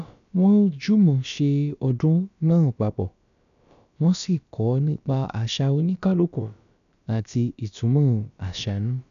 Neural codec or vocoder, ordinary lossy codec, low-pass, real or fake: codec, 16 kHz, about 1 kbps, DyCAST, with the encoder's durations; none; 7.2 kHz; fake